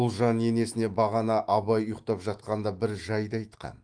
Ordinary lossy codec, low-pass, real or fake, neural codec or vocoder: Opus, 24 kbps; 9.9 kHz; fake; autoencoder, 48 kHz, 128 numbers a frame, DAC-VAE, trained on Japanese speech